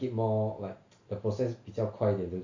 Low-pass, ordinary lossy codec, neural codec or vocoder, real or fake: 7.2 kHz; AAC, 48 kbps; none; real